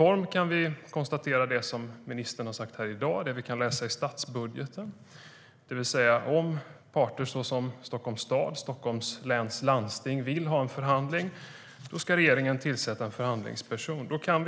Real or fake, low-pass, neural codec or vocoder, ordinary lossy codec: real; none; none; none